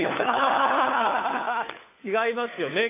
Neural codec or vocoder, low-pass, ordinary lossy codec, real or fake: codec, 16 kHz, 4 kbps, FunCodec, trained on LibriTTS, 50 frames a second; 3.6 kHz; AAC, 24 kbps; fake